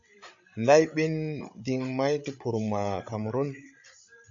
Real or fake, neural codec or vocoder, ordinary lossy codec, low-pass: fake; codec, 16 kHz, 8 kbps, FreqCodec, larger model; AAC, 64 kbps; 7.2 kHz